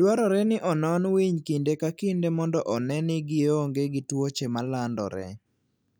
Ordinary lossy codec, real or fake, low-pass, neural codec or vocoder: none; real; none; none